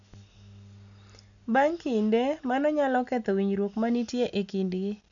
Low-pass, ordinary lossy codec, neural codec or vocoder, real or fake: 7.2 kHz; none; none; real